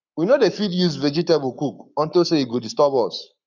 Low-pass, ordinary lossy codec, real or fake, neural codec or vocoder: 7.2 kHz; none; fake; codec, 44.1 kHz, 7.8 kbps, Pupu-Codec